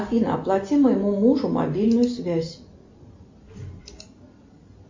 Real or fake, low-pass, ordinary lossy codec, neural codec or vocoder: real; 7.2 kHz; MP3, 48 kbps; none